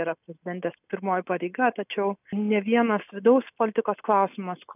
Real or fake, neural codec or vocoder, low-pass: real; none; 3.6 kHz